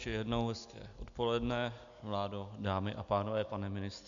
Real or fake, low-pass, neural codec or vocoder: real; 7.2 kHz; none